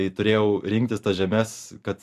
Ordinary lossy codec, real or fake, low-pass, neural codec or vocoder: AAC, 96 kbps; fake; 14.4 kHz; vocoder, 44.1 kHz, 128 mel bands every 512 samples, BigVGAN v2